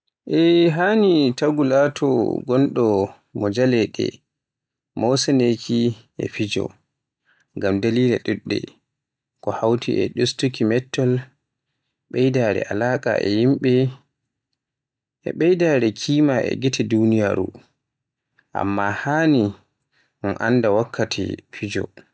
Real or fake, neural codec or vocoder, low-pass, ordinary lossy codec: real; none; none; none